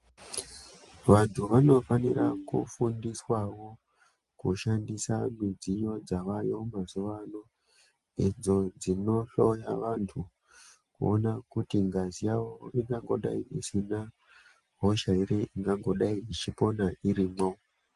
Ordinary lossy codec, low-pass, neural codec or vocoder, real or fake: Opus, 32 kbps; 10.8 kHz; none; real